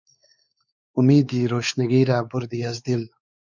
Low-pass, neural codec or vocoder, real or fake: 7.2 kHz; codec, 16 kHz, 4 kbps, X-Codec, WavLM features, trained on Multilingual LibriSpeech; fake